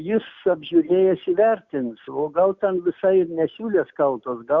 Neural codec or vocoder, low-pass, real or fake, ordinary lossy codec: none; 7.2 kHz; real; Opus, 64 kbps